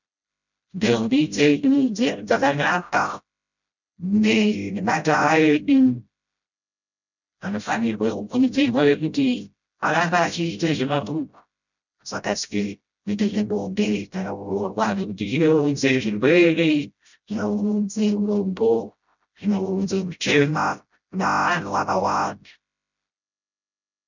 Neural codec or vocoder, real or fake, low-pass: codec, 16 kHz, 0.5 kbps, FreqCodec, smaller model; fake; 7.2 kHz